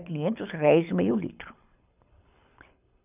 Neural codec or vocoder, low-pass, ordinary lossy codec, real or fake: codec, 24 kHz, 6 kbps, HILCodec; 3.6 kHz; none; fake